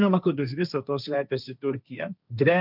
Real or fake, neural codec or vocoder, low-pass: fake; codec, 16 kHz, 1.1 kbps, Voila-Tokenizer; 5.4 kHz